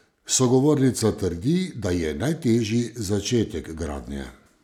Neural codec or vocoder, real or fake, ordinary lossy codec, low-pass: none; real; none; 19.8 kHz